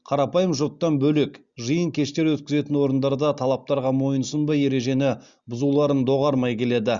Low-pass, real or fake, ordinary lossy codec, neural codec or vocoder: 7.2 kHz; real; Opus, 64 kbps; none